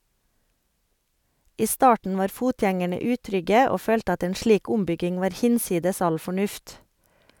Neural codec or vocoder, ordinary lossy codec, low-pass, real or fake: none; none; 19.8 kHz; real